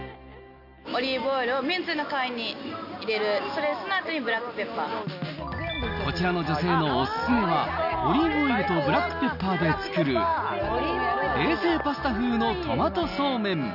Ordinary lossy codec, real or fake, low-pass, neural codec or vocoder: none; real; 5.4 kHz; none